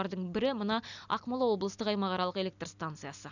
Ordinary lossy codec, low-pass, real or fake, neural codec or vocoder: none; 7.2 kHz; real; none